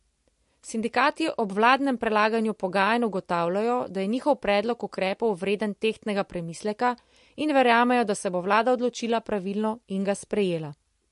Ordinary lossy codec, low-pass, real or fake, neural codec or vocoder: MP3, 48 kbps; 14.4 kHz; real; none